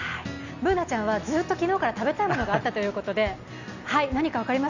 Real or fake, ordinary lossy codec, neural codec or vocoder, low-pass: real; none; none; 7.2 kHz